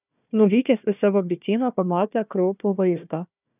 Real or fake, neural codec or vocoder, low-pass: fake; codec, 16 kHz, 1 kbps, FunCodec, trained on Chinese and English, 50 frames a second; 3.6 kHz